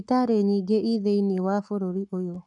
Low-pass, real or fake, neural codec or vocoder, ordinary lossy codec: 10.8 kHz; fake; codec, 44.1 kHz, 7.8 kbps, Pupu-Codec; none